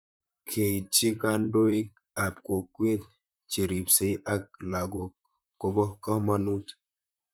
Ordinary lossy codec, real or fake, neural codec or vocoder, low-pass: none; fake; vocoder, 44.1 kHz, 128 mel bands, Pupu-Vocoder; none